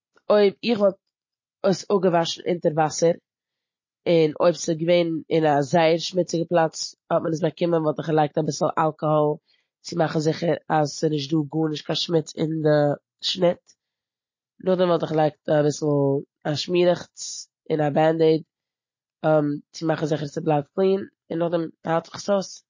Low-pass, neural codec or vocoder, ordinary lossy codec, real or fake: 7.2 kHz; none; MP3, 32 kbps; real